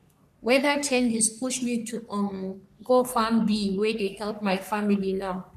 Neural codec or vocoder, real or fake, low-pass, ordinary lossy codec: codec, 44.1 kHz, 3.4 kbps, Pupu-Codec; fake; 14.4 kHz; none